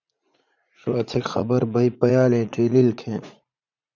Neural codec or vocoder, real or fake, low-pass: vocoder, 44.1 kHz, 80 mel bands, Vocos; fake; 7.2 kHz